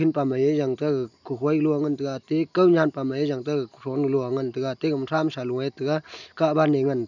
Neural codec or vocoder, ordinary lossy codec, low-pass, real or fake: none; none; 7.2 kHz; real